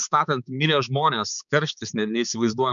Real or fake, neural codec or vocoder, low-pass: fake; codec, 16 kHz, 4 kbps, X-Codec, HuBERT features, trained on balanced general audio; 7.2 kHz